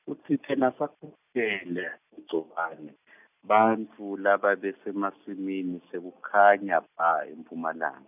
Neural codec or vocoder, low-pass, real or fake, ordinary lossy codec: none; 3.6 kHz; real; none